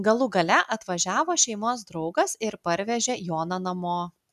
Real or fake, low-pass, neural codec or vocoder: real; 14.4 kHz; none